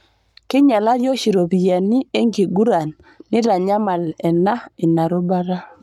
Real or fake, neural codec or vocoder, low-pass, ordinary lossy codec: fake; codec, 44.1 kHz, 7.8 kbps, Pupu-Codec; 19.8 kHz; none